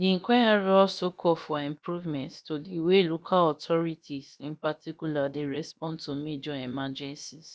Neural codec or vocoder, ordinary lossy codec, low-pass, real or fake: codec, 16 kHz, about 1 kbps, DyCAST, with the encoder's durations; none; none; fake